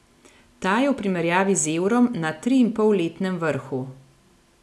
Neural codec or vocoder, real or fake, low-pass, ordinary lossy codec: none; real; none; none